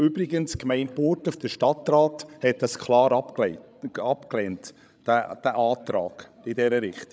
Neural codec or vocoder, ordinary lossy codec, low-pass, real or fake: codec, 16 kHz, 16 kbps, FreqCodec, larger model; none; none; fake